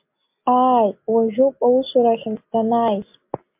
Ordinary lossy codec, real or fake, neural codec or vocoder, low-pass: MP3, 32 kbps; real; none; 3.6 kHz